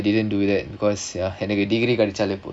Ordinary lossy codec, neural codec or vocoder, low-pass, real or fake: none; none; 9.9 kHz; real